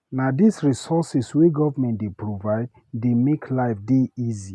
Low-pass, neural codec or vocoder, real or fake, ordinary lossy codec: none; none; real; none